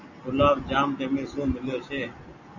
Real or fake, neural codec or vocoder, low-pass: real; none; 7.2 kHz